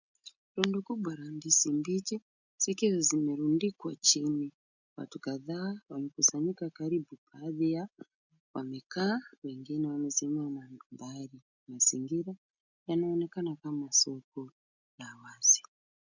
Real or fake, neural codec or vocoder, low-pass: real; none; 7.2 kHz